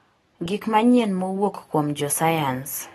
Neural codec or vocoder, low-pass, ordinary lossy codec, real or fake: none; 19.8 kHz; AAC, 32 kbps; real